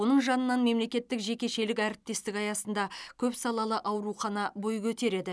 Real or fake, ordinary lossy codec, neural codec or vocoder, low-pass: real; none; none; none